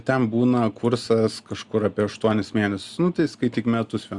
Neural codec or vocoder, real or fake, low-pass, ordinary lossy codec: none; real; 10.8 kHz; Opus, 64 kbps